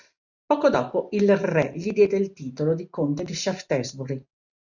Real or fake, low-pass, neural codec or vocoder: real; 7.2 kHz; none